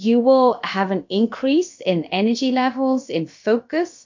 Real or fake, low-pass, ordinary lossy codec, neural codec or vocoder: fake; 7.2 kHz; MP3, 48 kbps; codec, 16 kHz, about 1 kbps, DyCAST, with the encoder's durations